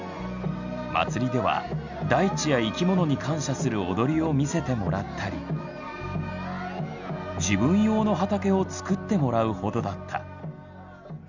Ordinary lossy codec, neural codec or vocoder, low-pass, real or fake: none; none; 7.2 kHz; real